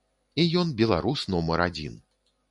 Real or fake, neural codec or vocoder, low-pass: real; none; 10.8 kHz